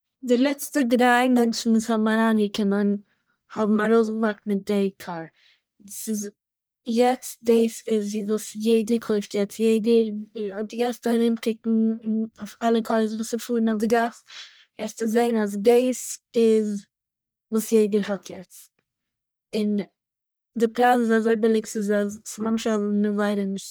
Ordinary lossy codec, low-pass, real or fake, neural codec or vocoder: none; none; fake; codec, 44.1 kHz, 1.7 kbps, Pupu-Codec